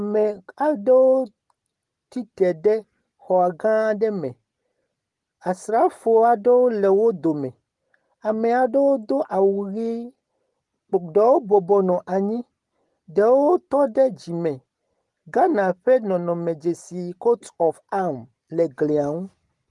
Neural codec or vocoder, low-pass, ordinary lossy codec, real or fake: none; 10.8 kHz; Opus, 24 kbps; real